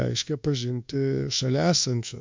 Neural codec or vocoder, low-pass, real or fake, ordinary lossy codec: codec, 24 kHz, 1.2 kbps, DualCodec; 7.2 kHz; fake; MP3, 64 kbps